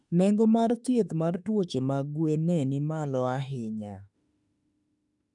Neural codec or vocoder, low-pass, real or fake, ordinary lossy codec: autoencoder, 48 kHz, 32 numbers a frame, DAC-VAE, trained on Japanese speech; 10.8 kHz; fake; none